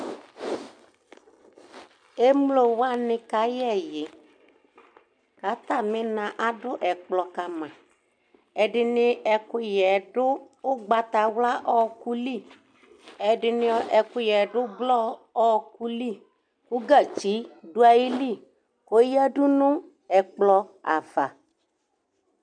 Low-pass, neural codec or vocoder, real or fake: 9.9 kHz; none; real